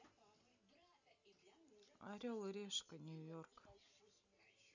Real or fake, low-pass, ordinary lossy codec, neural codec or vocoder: real; 7.2 kHz; none; none